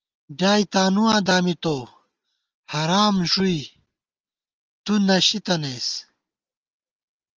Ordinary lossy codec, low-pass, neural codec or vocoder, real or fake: Opus, 24 kbps; 7.2 kHz; none; real